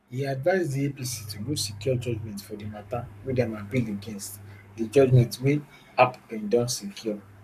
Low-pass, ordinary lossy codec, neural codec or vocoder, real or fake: 14.4 kHz; none; codec, 44.1 kHz, 7.8 kbps, Pupu-Codec; fake